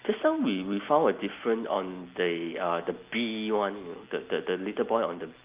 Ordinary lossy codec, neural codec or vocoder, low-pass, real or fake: Opus, 32 kbps; none; 3.6 kHz; real